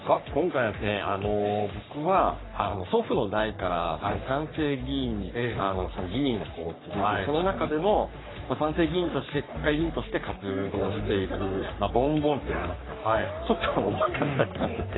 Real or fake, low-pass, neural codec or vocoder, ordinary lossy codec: fake; 7.2 kHz; codec, 44.1 kHz, 3.4 kbps, Pupu-Codec; AAC, 16 kbps